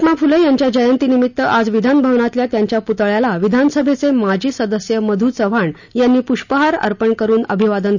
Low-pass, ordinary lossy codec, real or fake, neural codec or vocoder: 7.2 kHz; none; real; none